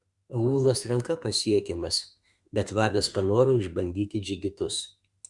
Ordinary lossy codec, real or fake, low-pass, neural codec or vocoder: Opus, 64 kbps; fake; 10.8 kHz; autoencoder, 48 kHz, 32 numbers a frame, DAC-VAE, trained on Japanese speech